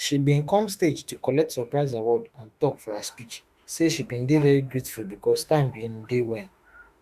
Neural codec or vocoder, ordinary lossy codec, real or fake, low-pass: autoencoder, 48 kHz, 32 numbers a frame, DAC-VAE, trained on Japanese speech; Opus, 64 kbps; fake; 14.4 kHz